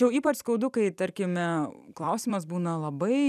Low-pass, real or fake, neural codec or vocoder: 14.4 kHz; fake; vocoder, 44.1 kHz, 128 mel bands every 256 samples, BigVGAN v2